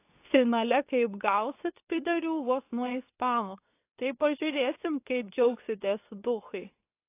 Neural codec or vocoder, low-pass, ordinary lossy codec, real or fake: codec, 24 kHz, 0.9 kbps, WavTokenizer, medium speech release version 2; 3.6 kHz; AAC, 24 kbps; fake